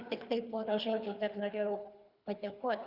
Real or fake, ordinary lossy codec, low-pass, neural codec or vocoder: fake; Opus, 64 kbps; 5.4 kHz; codec, 24 kHz, 3 kbps, HILCodec